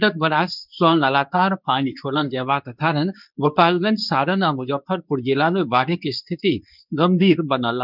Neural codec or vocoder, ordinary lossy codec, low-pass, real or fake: codec, 24 kHz, 0.9 kbps, WavTokenizer, medium speech release version 2; none; 5.4 kHz; fake